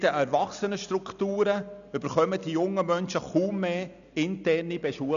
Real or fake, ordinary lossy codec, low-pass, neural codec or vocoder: real; none; 7.2 kHz; none